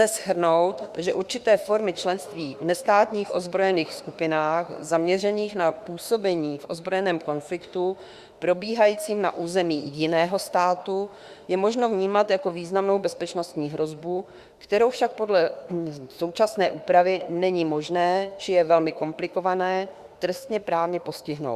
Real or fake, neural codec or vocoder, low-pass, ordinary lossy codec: fake; autoencoder, 48 kHz, 32 numbers a frame, DAC-VAE, trained on Japanese speech; 14.4 kHz; Opus, 64 kbps